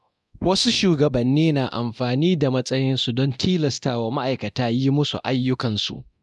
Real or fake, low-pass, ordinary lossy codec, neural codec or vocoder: fake; none; none; codec, 24 kHz, 0.9 kbps, DualCodec